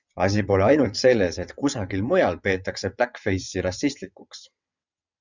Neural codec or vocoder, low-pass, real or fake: vocoder, 22.05 kHz, 80 mel bands, WaveNeXt; 7.2 kHz; fake